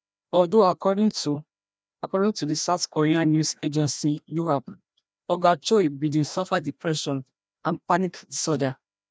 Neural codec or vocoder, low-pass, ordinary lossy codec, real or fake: codec, 16 kHz, 1 kbps, FreqCodec, larger model; none; none; fake